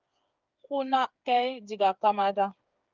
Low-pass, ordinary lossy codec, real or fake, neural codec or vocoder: 7.2 kHz; Opus, 24 kbps; fake; codec, 16 kHz, 8 kbps, FreqCodec, smaller model